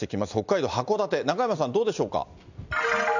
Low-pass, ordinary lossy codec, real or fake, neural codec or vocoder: 7.2 kHz; none; real; none